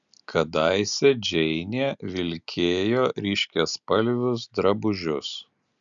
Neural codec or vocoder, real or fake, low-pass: none; real; 7.2 kHz